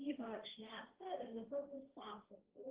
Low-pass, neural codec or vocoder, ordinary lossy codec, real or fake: 3.6 kHz; codec, 16 kHz, 1.1 kbps, Voila-Tokenizer; Opus, 16 kbps; fake